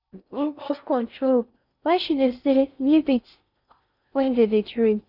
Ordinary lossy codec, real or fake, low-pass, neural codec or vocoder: none; fake; 5.4 kHz; codec, 16 kHz in and 24 kHz out, 0.6 kbps, FocalCodec, streaming, 4096 codes